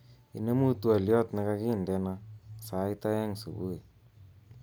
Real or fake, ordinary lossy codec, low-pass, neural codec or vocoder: real; none; none; none